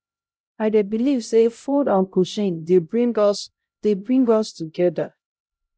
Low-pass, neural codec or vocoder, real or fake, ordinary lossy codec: none; codec, 16 kHz, 0.5 kbps, X-Codec, HuBERT features, trained on LibriSpeech; fake; none